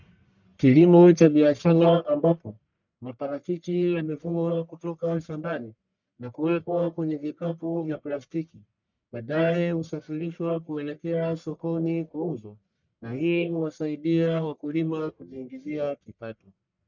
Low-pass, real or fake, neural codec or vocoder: 7.2 kHz; fake; codec, 44.1 kHz, 1.7 kbps, Pupu-Codec